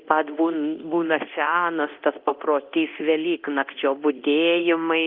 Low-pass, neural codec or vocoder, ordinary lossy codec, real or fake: 5.4 kHz; codec, 24 kHz, 0.9 kbps, DualCodec; Opus, 24 kbps; fake